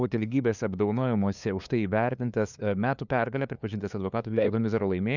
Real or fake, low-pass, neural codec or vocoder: fake; 7.2 kHz; codec, 16 kHz, 2 kbps, FunCodec, trained on LibriTTS, 25 frames a second